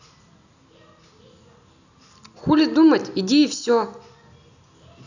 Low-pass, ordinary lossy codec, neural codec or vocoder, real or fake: 7.2 kHz; none; none; real